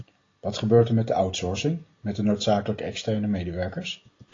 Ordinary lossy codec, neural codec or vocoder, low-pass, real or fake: AAC, 32 kbps; none; 7.2 kHz; real